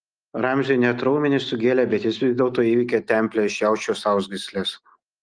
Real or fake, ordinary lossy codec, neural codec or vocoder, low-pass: real; Opus, 24 kbps; none; 9.9 kHz